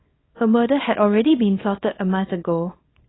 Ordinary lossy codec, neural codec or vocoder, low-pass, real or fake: AAC, 16 kbps; codec, 24 kHz, 0.9 kbps, WavTokenizer, small release; 7.2 kHz; fake